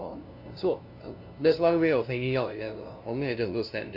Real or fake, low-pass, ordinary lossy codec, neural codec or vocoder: fake; 5.4 kHz; AAC, 48 kbps; codec, 16 kHz, 0.5 kbps, FunCodec, trained on LibriTTS, 25 frames a second